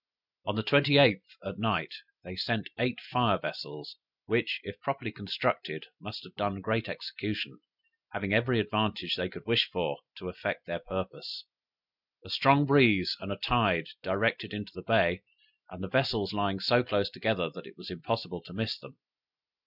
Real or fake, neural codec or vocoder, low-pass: real; none; 5.4 kHz